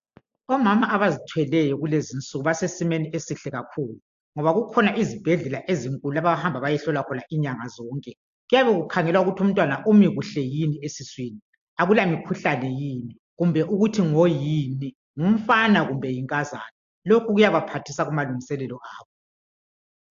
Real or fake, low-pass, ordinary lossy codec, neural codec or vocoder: real; 7.2 kHz; AAC, 64 kbps; none